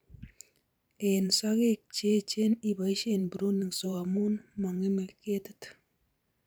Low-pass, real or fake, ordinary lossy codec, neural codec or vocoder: none; fake; none; vocoder, 44.1 kHz, 128 mel bands every 512 samples, BigVGAN v2